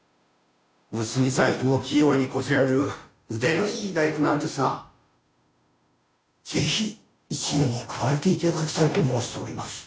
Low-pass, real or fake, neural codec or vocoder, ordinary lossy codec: none; fake; codec, 16 kHz, 0.5 kbps, FunCodec, trained on Chinese and English, 25 frames a second; none